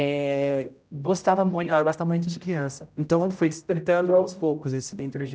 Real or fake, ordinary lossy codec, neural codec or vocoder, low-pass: fake; none; codec, 16 kHz, 0.5 kbps, X-Codec, HuBERT features, trained on general audio; none